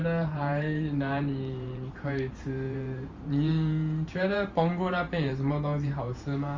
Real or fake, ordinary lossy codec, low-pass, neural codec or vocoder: fake; Opus, 32 kbps; 7.2 kHz; vocoder, 44.1 kHz, 128 mel bands every 512 samples, BigVGAN v2